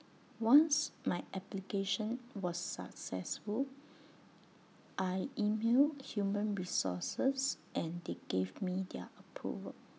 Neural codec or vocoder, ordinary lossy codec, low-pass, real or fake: none; none; none; real